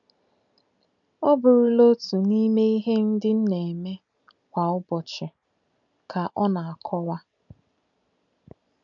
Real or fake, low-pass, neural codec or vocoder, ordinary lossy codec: real; 7.2 kHz; none; none